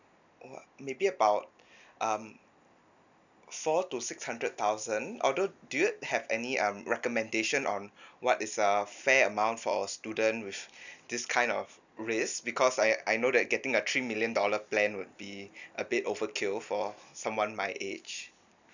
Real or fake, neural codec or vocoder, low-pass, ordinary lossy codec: real; none; 7.2 kHz; none